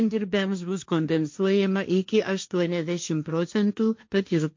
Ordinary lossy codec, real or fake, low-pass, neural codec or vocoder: MP3, 48 kbps; fake; 7.2 kHz; codec, 16 kHz, 1.1 kbps, Voila-Tokenizer